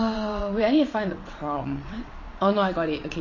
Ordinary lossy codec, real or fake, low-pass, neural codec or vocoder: MP3, 32 kbps; fake; 7.2 kHz; vocoder, 22.05 kHz, 80 mel bands, WaveNeXt